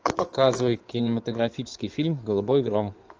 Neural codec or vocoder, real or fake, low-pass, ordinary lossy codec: codec, 16 kHz in and 24 kHz out, 2.2 kbps, FireRedTTS-2 codec; fake; 7.2 kHz; Opus, 24 kbps